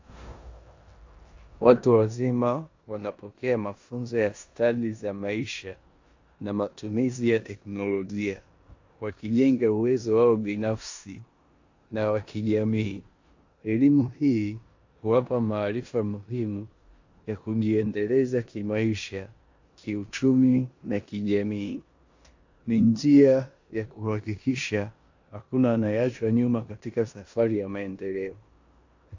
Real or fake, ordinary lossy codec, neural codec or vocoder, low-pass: fake; AAC, 48 kbps; codec, 16 kHz in and 24 kHz out, 0.9 kbps, LongCat-Audio-Codec, four codebook decoder; 7.2 kHz